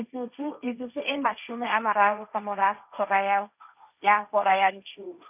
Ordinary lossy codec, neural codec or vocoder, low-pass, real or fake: none; codec, 16 kHz, 1.1 kbps, Voila-Tokenizer; 3.6 kHz; fake